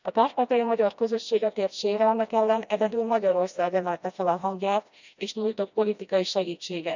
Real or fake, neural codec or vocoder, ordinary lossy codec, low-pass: fake; codec, 16 kHz, 1 kbps, FreqCodec, smaller model; none; 7.2 kHz